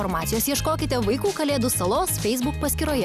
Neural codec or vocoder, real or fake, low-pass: none; real; 14.4 kHz